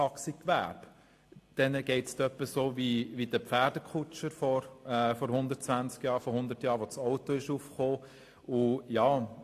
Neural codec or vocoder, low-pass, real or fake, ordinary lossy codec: none; 14.4 kHz; real; AAC, 64 kbps